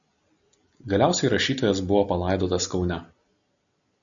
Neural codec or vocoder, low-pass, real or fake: none; 7.2 kHz; real